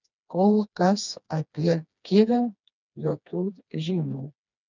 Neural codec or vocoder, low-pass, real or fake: codec, 16 kHz, 2 kbps, FreqCodec, smaller model; 7.2 kHz; fake